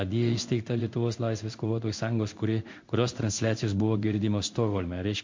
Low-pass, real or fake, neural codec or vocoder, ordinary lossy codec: 7.2 kHz; fake; codec, 16 kHz in and 24 kHz out, 1 kbps, XY-Tokenizer; MP3, 48 kbps